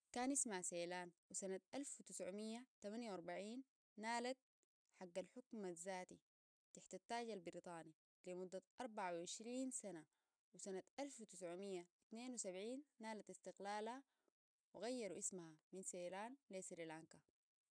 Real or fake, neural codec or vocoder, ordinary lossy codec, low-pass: real; none; none; 9.9 kHz